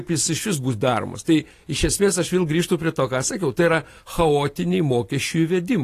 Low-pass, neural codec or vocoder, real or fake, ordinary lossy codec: 14.4 kHz; none; real; AAC, 48 kbps